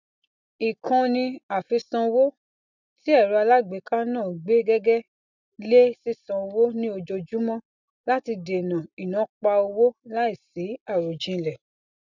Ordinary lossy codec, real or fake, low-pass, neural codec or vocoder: none; real; 7.2 kHz; none